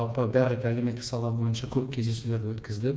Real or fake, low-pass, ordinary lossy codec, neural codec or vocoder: fake; none; none; codec, 16 kHz, 2 kbps, FreqCodec, smaller model